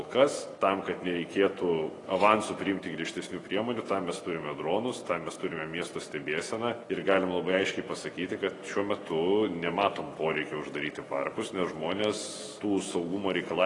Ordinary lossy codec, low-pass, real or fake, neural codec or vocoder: AAC, 32 kbps; 10.8 kHz; real; none